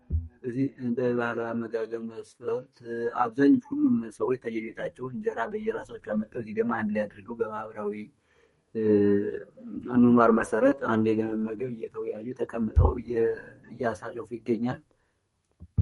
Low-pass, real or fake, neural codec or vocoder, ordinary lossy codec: 14.4 kHz; fake; codec, 32 kHz, 1.9 kbps, SNAC; MP3, 48 kbps